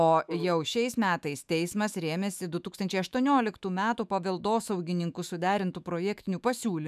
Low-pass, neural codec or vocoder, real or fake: 14.4 kHz; autoencoder, 48 kHz, 128 numbers a frame, DAC-VAE, trained on Japanese speech; fake